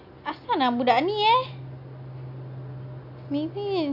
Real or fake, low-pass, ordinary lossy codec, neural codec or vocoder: real; 5.4 kHz; AAC, 48 kbps; none